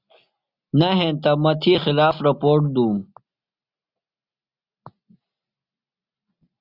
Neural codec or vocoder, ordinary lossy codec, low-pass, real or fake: none; Opus, 64 kbps; 5.4 kHz; real